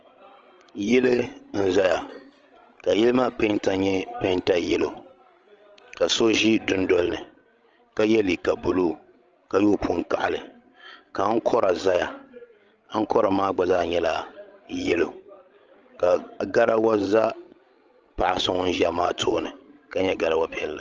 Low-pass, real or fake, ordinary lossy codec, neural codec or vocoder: 7.2 kHz; fake; Opus, 24 kbps; codec, 16 kHz, 16 kbps, FreqCodec, larger model